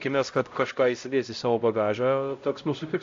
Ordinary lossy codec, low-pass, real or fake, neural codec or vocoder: AAC, 48 kbps; 7.2 kHz; fake; codec, 16 kHz, 0.5 kbps, X-Codec, HuBERT features, trained on LibriSpeech